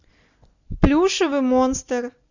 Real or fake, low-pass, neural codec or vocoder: real; 7.2 kHz; none